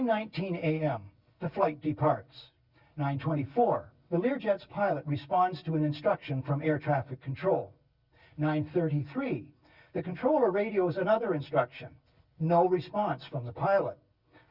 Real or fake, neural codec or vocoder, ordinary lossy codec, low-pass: real; none; Opus, 64 kbps; 5.4 kHz